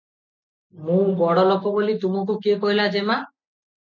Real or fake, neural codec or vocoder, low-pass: real; none; 7.2 kHz